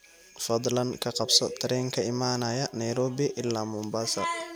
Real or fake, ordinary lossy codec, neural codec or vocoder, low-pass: real; none; none; none